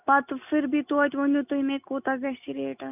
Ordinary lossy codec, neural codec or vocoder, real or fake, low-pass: none; none; real; 3.6 kHz